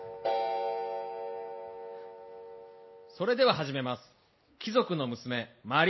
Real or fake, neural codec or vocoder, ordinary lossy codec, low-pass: real; none; MP3, 24 kbps; 7.2 kHz